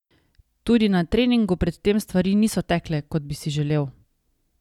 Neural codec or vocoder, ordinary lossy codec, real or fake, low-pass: none; none; real; 19.8 kHz